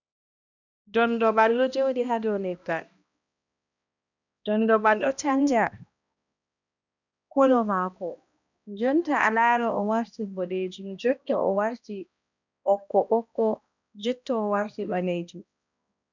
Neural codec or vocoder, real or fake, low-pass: codec, 16 kHz, 1 kbps, X-Codec, HuBERT features, trained on balanced general audio; fake; 7.2 kHz